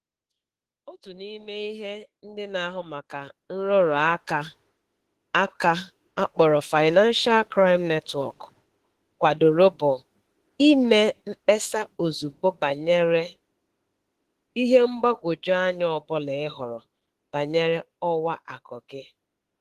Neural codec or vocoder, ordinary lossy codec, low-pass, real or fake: autoencoder, 48 kHz, 32 numbers a frame, DAC-VAE, trained on Japanese speech; Opus, 16 kbps; 14.4 kHz; fake